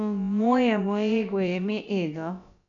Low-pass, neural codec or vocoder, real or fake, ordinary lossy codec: 7.2 kHz; codec, 16 kHz, about 1 kbps, DyCAST, with the encoder's durations; fake; none